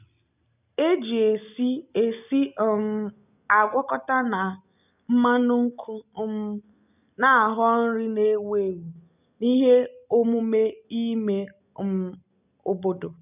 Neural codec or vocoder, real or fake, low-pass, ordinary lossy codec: none; real; 3.6 kHz; none